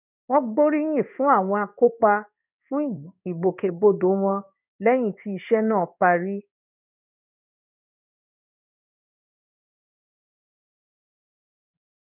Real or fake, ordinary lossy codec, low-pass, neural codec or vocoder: fake; none; 3.6 kHz; codec, 16 kHz in and 24 kHz out, 1 kbps, XY-Tokenizer